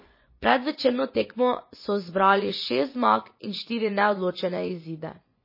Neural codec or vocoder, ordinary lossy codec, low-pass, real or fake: vocoder, 24 kHz, 100 mel bands, Vocos; MP3, 24 kbps; 5.4 kHz; fake